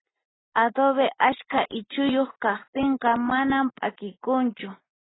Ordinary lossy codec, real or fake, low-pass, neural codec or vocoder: AAC, 16 kbps; real; 7.2 kHz; none